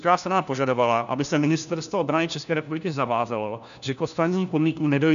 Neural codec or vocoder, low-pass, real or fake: codec, 16 kHz, 1 kbps, FunCodec, trained on LibriTTS, 50 frames a second; 7.2 kHz; fake